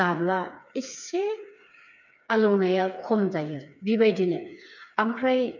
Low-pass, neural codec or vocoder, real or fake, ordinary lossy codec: 7.2 kHz; codec, 16 kHz, 4 kbps, FreqCodec, smaller model; fake; none